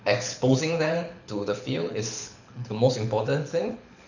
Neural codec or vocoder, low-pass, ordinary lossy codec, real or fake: codec, 24 kHz, 6 kbps, HILCodec; 7.2 kHz; none; fake